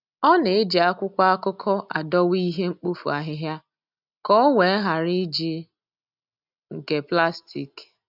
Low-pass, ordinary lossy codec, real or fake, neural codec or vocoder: 5.4 kHz; none; real; none